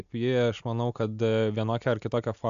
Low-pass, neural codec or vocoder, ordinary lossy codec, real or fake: 7.2 kHz; none; AAC, 96 kbps; real